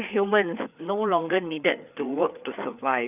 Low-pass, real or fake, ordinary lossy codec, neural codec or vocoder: 3.6 kHz; fake; none; codec, 16 kHz, 4 kbps, FreqCodec, larger model